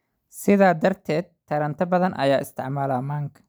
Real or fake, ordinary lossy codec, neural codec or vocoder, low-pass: real; none; none; none